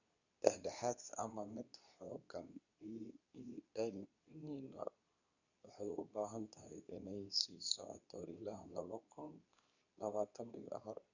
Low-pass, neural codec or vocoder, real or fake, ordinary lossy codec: 7.2 kHz; codec, 24 kHz, 0.9 kbps, WavTokenizer, medium speech release version 2; fake; none